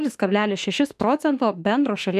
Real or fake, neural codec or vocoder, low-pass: fake; autoencoder, 48 kHz, 32 numbers a frame, DAC-VAE, trained on Japanese speech; 14.4 kHz